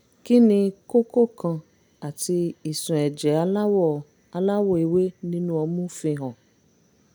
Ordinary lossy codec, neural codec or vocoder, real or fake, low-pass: none; none; real; none